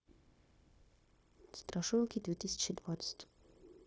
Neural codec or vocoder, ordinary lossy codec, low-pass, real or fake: codec, 16 kHz, 0.9 kbps, LongCat-Audio-Codec; none; none; fake